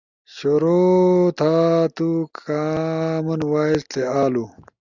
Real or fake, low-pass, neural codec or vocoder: real; 7.2 kHz; none